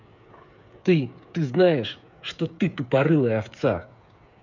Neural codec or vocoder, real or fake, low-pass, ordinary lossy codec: codec, 16 kHz, 8 kbps, FreqCodec, smaller model; fake; 7.2 kHz; none